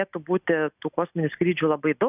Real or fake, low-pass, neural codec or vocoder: real; 3.6 kHz; none